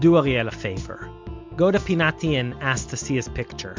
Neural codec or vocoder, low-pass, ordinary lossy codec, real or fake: none; 7.2 kHz; MP3, 64 kbps; real